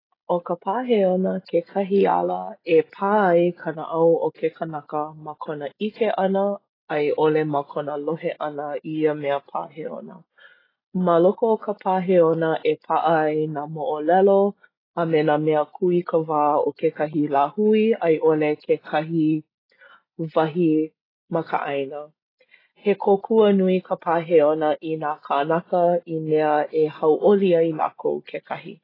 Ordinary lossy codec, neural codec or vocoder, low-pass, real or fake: AAC, 24 kbps; none; 5.4 kHz; real